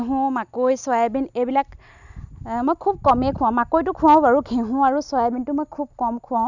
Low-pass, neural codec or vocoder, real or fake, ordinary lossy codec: 7.2 kHz; none; real; none